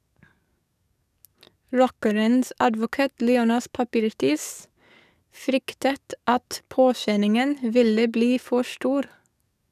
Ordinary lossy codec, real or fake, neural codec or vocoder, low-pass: none; fake; codec, 44.1 kHz, 7.8 kbps, DAC; 14.4 kHz